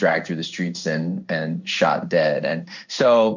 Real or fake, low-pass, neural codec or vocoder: fake; 7.2 kHz; codec, 16 kHz in and 24 kHz out, 1 kbps, XY-Tokenizer